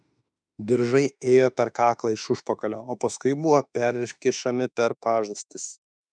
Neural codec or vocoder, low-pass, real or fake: autoencoder, 48 kHz, 32 numbers a frame, DAC-VAE, trained on Japanese speech; 9.9 kHz; fake